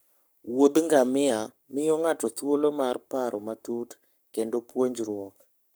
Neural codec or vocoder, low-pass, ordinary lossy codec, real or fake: codec, 44.1 kHz, 7.8 kbps, Pupu-Codec; none; none; fake